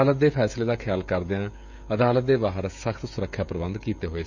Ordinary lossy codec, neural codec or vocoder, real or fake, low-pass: none; codec, 16 kHz, 16 kbps, FreqCodec, smaller model; fake; 7.2 kHz